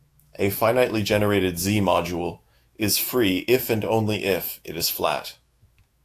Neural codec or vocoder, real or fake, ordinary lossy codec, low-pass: autoencoder, 48 kHz, 128 numbers a frame, DAC-VAE, trained on Japanese speech; fake; AAC, 64 kbps; 14.4 kHz